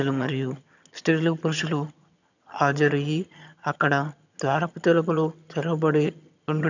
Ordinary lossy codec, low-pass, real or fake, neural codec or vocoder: none; 7.2 kHz; fake; vocoder, 22.05 kHz, 80 mel bands, HiFi-GAN